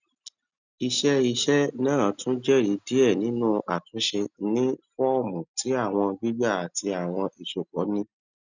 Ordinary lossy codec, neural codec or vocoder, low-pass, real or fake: none; none; 7.2 kHz; real